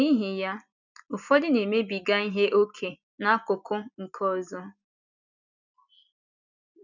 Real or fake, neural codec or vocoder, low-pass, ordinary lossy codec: real; none; 7.2 kHz; none